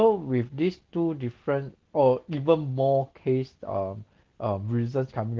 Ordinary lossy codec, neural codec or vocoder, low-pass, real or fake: Opus, 16 kbps; none; 7.2 kHz; real